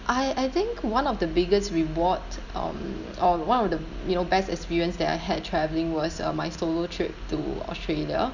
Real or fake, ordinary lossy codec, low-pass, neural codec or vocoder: real; none; 7.2 kHz; none